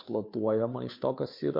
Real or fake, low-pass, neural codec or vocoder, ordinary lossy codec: fake; 5.4 kHz; codec, 16 kHz, 2 kbps, FunCodec, trained on Chinese and English, 25 frames a second; MP3, 32 kbps